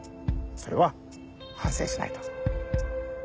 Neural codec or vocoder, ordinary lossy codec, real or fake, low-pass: none; none; real; none